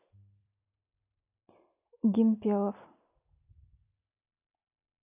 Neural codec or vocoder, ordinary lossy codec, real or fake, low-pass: none; none; real; 3.6 kHz